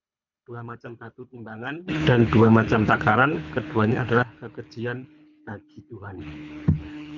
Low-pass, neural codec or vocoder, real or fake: 7.2 kHz; codec, 24 kHz, 3 kbps, HILCodec; fake